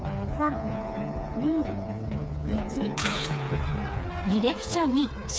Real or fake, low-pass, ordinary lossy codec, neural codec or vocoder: fake; none; none; codec, 16 kHz, 4 kbps, FreqCodec, smaller model